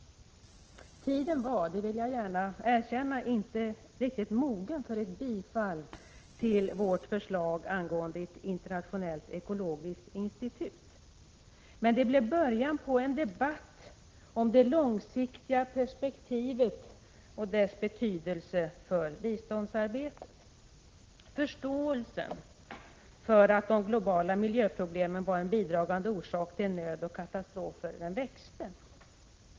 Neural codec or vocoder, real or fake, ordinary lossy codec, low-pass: none; real; Opus, 16 kbps; 7.2 kHz